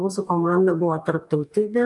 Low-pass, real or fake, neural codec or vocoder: 10.8 kHz; fake; codec, 44.1 kHz, 2.6 kbps, DAC